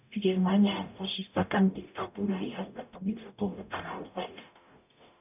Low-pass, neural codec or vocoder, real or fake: 3.6 kHz; codec, 44.1 kHz, 0.9 kbps, DAC; fake